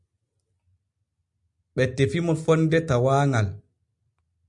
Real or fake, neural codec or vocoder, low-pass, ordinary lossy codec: real; none; 10.8 kHz; MP3, 96 kbps